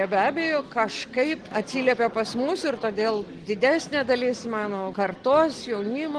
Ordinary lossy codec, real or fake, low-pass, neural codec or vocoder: Opus, 16 kbps; real; 10.8 kHz; none